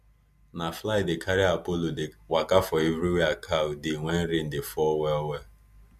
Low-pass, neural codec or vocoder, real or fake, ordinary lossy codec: 14.4 kHz; none; real; MP3, 96 kbps